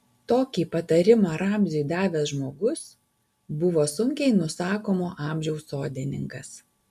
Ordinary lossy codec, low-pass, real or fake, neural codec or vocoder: AAC, 96 kbps; 14.4 kHz; real; none